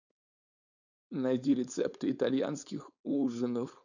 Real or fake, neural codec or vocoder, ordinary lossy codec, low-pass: fake; codec, 16 kHz, 4.8 kbps, FACodec; none; 7.2 kHz